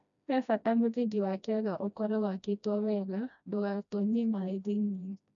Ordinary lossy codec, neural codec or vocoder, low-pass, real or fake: none; codec, 16 kHz, 1 kbps, FreqCodec, smaller model; 7.2 kHz; fake